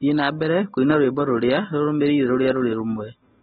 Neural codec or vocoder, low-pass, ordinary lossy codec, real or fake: none; 10.8 kHz; AAC, 16 kbps; real